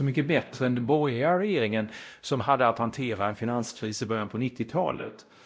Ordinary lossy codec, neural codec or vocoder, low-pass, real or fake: none; codec, 16 kHz, 0.5 kbps, X-Codec, WavLM features, trained on Multilingual LibriSpeech; none; fake